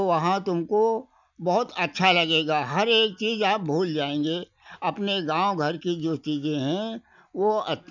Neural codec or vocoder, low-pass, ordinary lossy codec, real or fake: none; 7.2 kHz; none; real